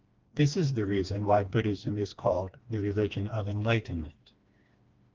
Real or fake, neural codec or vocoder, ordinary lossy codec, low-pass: fake; codec, 16 kHz, 2 kbps, FreqCodec, smaller model; Opus, 24 kbps; 7.2 kHz